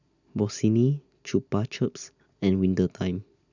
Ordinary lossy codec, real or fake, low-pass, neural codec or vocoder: none; real; 7.2 kHz; none